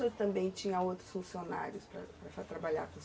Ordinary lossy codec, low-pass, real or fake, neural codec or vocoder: none; none; real; none